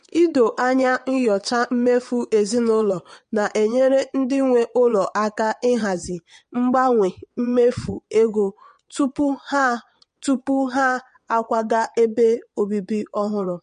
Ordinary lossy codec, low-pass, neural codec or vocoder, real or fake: MP3, 48 kbps; 9.9 kHz; vocoder, 22.05 kHz, 80 mel bands, WaveNeXt; fake